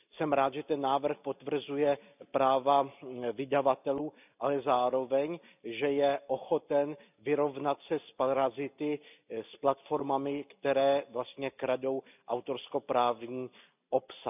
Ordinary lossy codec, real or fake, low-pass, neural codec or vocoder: none; real; 3.6 kHz; none